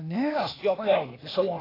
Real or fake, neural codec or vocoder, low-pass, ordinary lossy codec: fake; codec, 16 kHz, 0.8 kbps, ZipCodec; 5.4 kHz; AAC, 24 kbps